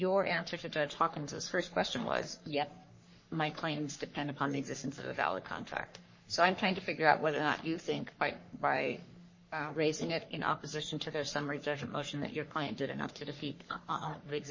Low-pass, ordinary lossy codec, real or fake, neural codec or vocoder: 7.2 kHz; MP3, 32 kbps; fake; codec, 44.1 kHz, 3.4 kbps, Pupu-Codec